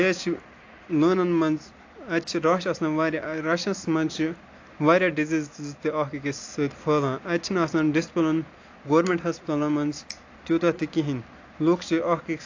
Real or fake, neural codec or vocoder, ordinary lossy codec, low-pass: real; none; AAC, 48 kbps; 7.2 kHz